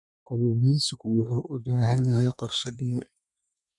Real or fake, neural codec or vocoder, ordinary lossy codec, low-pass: fake; codec, 24 kHz, 1 kbps, SNAC; none; 10.8 kHz